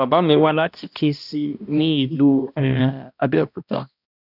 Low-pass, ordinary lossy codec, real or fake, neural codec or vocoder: 5.4 kHz; none; fake; codec, 16 kHz, 1 kbps, X-Codec, HuBERT features, trained on balanced general audio